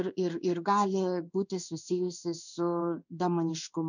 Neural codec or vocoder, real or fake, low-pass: codec, 16 kHz in and 24 kHz out, 1 kbps, XY-Tokenizer; fake; 7.2 kHz